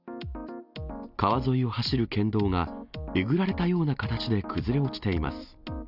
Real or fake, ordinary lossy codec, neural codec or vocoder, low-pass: real; none; none; 5.4 kHz